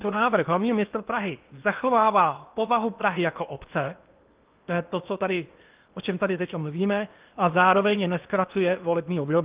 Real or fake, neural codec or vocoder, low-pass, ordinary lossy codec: fake; codec, 16 kHz in and 24 kHz out, 0.8 kbps, FocalCodec, streaming, 65536 codes; 3.6 kHz; Opus, 32 kbps